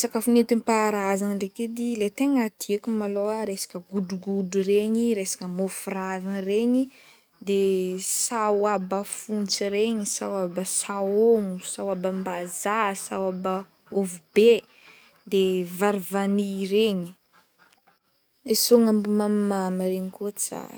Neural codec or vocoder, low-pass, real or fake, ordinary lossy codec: codec, 44.1 kHz, 7.8 kbps, DAC; none; fake; none